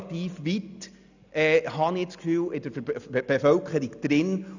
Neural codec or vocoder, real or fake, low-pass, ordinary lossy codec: none; real; 7.2 kHz; none